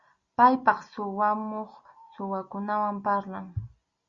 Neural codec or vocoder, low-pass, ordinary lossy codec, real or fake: none; 7.2 kHz; Opus, 64 kbps; real